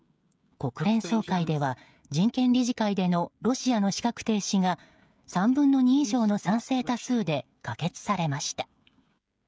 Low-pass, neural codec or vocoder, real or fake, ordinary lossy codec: none; codec, 16 kHz, 16 kbps, FreqCodec, smaller model; fake; none